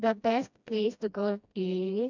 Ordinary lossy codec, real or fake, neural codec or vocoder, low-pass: none; fake; codec, 16 kHz, 1 kbps, FreqCodec, smaller model; 7.2 kHz